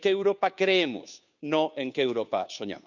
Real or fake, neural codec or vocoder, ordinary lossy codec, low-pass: fake; codec, 16 kHz, 2 kbps, FunCodec, trained on Chinese and English, 25 frames a second; none; 7.2 kHz